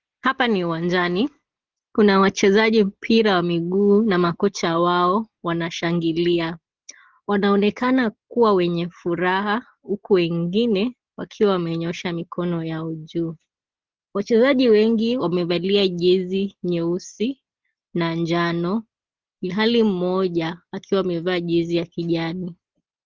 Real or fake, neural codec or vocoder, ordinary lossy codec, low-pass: real; none; Opus, 16 kbps; 7.2 kHz